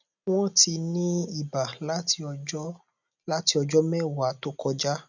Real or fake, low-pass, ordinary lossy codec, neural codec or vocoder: real; 7.2 kHz; none; none